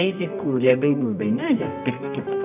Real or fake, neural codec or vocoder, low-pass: fake; codec, 24 kHz, 0.9 kbps, WavTokenizer, medium music audio release; 3.6 kHz